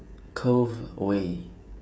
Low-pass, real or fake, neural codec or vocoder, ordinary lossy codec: none; fake; codec, 16 kHz, 16 kbps, FreqCodec, smaller model; none